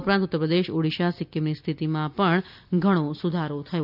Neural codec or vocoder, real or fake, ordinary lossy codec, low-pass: none; real; none; 5.4 kHz